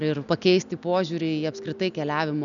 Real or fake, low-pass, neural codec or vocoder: real; 7.2 kHz; none